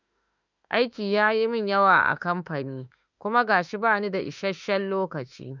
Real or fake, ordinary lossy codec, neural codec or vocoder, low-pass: fake; none; autoencoder, 48 kHz, 32 numbers a frame, DAC-VAE, trained on Japanese speech; 7.2 kHz